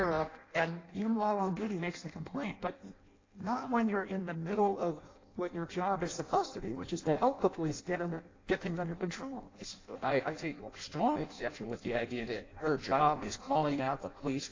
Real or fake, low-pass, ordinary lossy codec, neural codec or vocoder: fake; 7.2 kHz; AAC, 32 kbps; codec, 16 kHz in and 24 kHz out, 0.6 kbps, FireRedTTS-2 codec